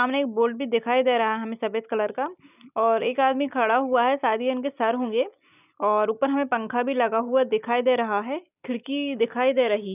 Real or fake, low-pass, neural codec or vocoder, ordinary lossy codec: real; 3.6 kHz; none; none